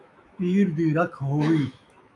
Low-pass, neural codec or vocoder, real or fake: 10.8 kHz; autoencoder, 48 kHz, 128 numbers a frame, DAC-VAE, trained on Japanese speech; fake